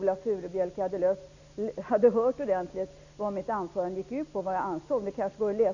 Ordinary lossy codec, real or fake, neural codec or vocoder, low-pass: none; real; none; 7.2 kHz